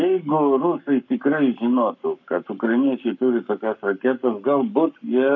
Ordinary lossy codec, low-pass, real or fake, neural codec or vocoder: MP3, 64 kbps; 7.2 kHz; fake; codec, 44.1 kHz, 7.8 kbps, Pupu-Codec